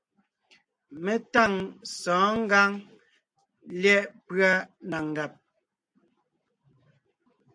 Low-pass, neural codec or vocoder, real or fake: 9.9 kHz; none; real